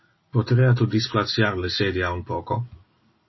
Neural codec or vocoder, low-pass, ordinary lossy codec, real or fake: none; 7.2 kHz; MP3, 24 kbps; real